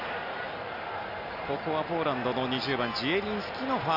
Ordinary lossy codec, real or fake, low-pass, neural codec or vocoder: none; real; 5.4 kHz; none